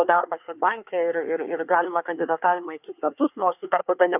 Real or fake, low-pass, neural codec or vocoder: fake; 3.6 kHz; codec, 24 kHz, 1 kbps, SNAC